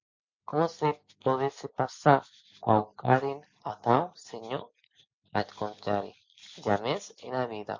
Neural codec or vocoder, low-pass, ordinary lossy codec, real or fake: none; 7.2 kHz; MP3, 48 kbps; real